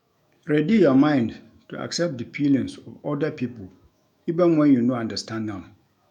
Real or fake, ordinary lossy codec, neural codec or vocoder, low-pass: fake; none; autoencoder, 48 kHz, 128 numbers a frame, DAC-VAE, trained on Japanese speech; 19.8 kHz